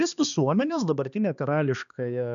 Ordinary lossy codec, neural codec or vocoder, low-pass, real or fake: MP3, 96 kbps; codec, 16 kHz, 2 kbps, X-Codec, HuBERT features, trained on balanced general audio; 7.2 kHz; fake